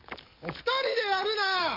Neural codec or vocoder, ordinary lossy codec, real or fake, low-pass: codec, 44.1 kHz, 7.8 kbps, DAC; MP3, 32 kbps; fake; 5.4 kHz